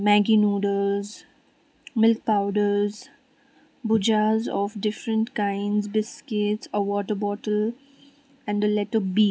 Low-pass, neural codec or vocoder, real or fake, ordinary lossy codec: none; none; real; none